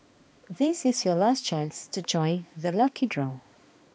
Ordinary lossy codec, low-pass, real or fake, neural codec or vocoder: none; none; fake; codec, 16 kHz, 2 kbps, X-Codec, HuBERT features, trained on balanced general audio